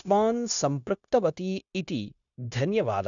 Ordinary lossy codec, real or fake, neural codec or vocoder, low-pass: none; fake; codec, 16 kHz, 0.9 kbps, LongCat-Audio-Codec; 7.2 kHz